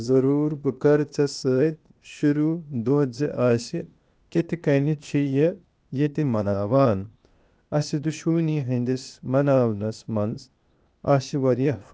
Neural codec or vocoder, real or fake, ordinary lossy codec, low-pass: codec, 16 kHz, 0.8 kbps, ZipCodec; fake; none; none